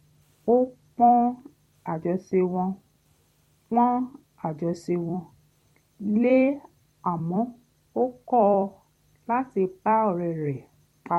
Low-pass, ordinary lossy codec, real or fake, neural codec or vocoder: 19.8 kHz; MP3, 64 kbps; fake; vocoder, 44.1 kHz, 128 mel bands every 256 samples, BigVGAN v2